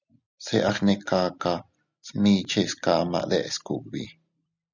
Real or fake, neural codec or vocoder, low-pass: real; none; 7.2 kHz